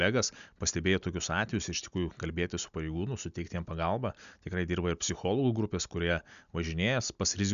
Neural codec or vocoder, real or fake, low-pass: none; real; 7.2 kHz